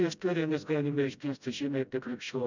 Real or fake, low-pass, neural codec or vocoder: fake; 7.2 kHz; codec, 16 kHz, 0.5 kbps, FreqCodec, smaller model